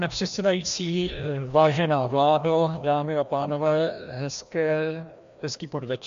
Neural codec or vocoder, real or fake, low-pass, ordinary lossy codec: codec, 16 kHz, 1 kbps, FreqCodec, larger model; fake; 7.2 kHz; AAC, 64 kbps